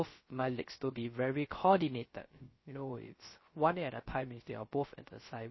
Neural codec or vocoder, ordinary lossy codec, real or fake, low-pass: codec, 16 kHz, 0.3 kbps, FocalCodec; MP3, 24 kbps; fake; 7.2 kHz